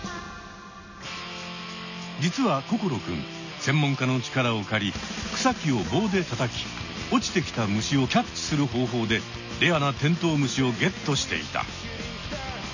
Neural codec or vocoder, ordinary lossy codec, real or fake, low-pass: none; none; real; 7.2 kHz